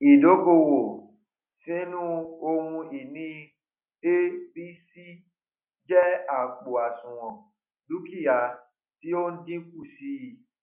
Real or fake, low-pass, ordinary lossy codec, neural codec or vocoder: real; 3.6 kHz; none; none